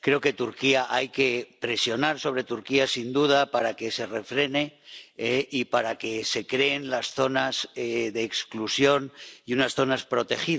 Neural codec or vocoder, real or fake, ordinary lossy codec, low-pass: none; real; none; none